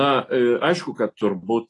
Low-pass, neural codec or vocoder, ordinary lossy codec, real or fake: 10.8 kHz; none; AAC, 32 kbps; real